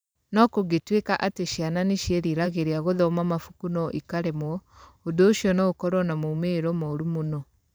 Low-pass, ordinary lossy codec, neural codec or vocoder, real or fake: none; none; vocoder, 44.1 kHz, 128 mel bands every 512 samples, BigVGAN v2; fake